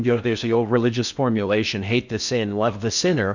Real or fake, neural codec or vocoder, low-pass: fake; codec, 16 kHz in and 24 kHz out, 0.6 kbps, FocalCodec, streaming, 4096 codes; 7.2 kHz